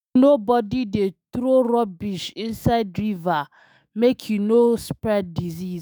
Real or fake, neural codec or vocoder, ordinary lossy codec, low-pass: fake; autoencoder, 48 kHz, 128 numbers a frame, DAC-VAE, trained on Japanese speech; none; none